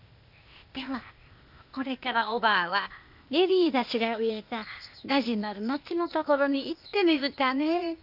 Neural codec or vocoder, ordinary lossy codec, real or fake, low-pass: codec, 16 kHz, 0.8 kbps, ZipCodec; none; fake; 5.4 kHz